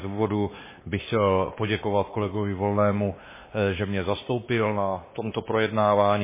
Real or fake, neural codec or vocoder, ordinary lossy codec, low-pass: fake; codec, 16 kHz, 2 kbps, X-Codec, WavLM features, trained on Multilingual LibriSpeech; MP3, 16 kbps; 3.6 kHz